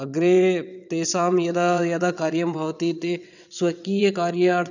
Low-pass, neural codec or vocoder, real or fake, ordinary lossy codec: 7.2 kHz; vocoder, 22.05 kHz, 80 mel bands, WaveNeXt; fake; none